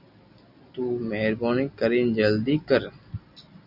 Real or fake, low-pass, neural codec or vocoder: real; 5.4 kHz; none